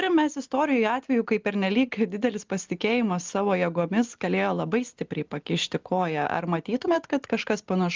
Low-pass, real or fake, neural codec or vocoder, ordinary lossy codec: 7.2 kHz; real; none; Opus, 24 kbps